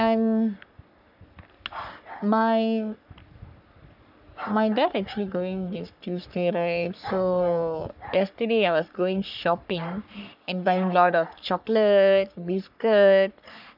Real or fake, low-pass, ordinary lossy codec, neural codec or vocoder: fake; 5.4 kHz; none; codec, 44.1 kHz, 3.4 kbps, Pupu-Codec